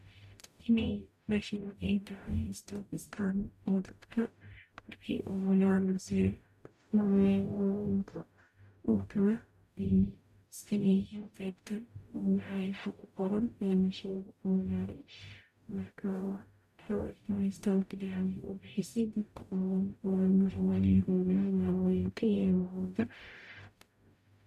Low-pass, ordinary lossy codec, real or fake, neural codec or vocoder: 14.4 kHz; MP3, 96 kbps; fake; codec, 44.1 kHz, 0.9 kbps, DAC